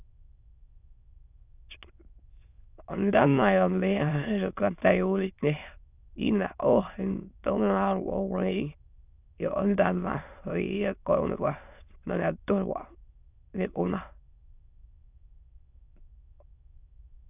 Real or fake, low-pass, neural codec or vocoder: fake; 3.6 kHz; autoencoder, 22.05 kHz, a latent of 192 numbers a frame, VITS, trained on many speakers